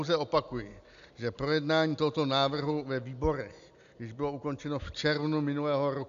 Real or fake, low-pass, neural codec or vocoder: real; 7.2 kHz; none